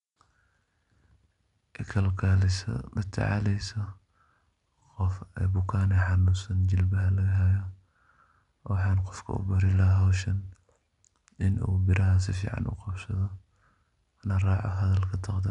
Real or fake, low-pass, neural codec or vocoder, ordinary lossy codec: real; 10.8 kHz; none; none